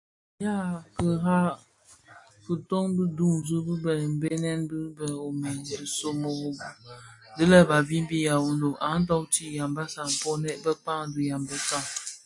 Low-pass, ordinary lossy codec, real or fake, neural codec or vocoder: 10.8 kHz; AAC, 64 kbps; real; none